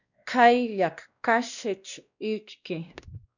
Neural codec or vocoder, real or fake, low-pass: codec, 16 kHz, 1 kbps, X-Codec, WavLM features, trained on Multilingual LibriSpeech; fake; 7.2 kHz